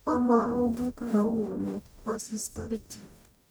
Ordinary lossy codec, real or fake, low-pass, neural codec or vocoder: none; fake; none; codec, 44.1 kHz, 0.9 kbps, DAC